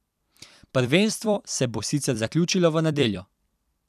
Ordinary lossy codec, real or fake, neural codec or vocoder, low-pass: none; fake; vocoder, 44.1 kHz, 128 mel bands every 256 samples, BigVGAN v2; 14.4 kHz